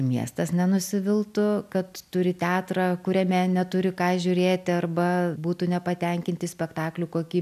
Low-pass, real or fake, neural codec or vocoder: 14.4 kHz; real; none